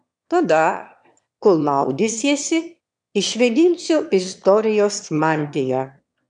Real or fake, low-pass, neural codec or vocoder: fake; 9.9 kHz; autoencoder, 22.05 kHz, a latent of 192 numbers a frame, VITS, trained on one speaker